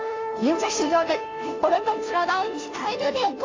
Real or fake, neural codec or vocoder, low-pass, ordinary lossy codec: fake; codec, 16 kHz, 0.5 kbps, FunCodec, trained on Chinese and English, 25 frames a second; 7.2 kHz; AAC, 32 kbps